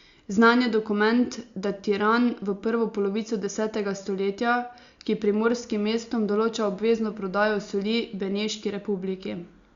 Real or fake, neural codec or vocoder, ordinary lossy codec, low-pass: real; none; Opus, 64 kbps; 7.2 kHz